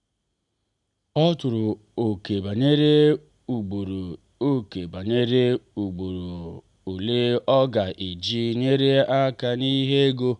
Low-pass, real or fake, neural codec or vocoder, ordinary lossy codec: 10.8 kHz; real; none; none